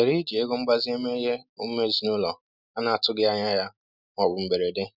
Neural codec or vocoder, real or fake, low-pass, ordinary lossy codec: none; real; 5.4 kHz; none